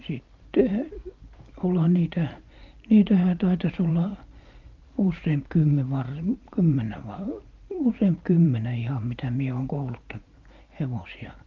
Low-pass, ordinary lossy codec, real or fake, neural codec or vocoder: 7.2 kHz; Opus, 32 kbps; fake; vocoder, 44.1 kHz, 128 mel bands every 512 samples, BigVGAN v2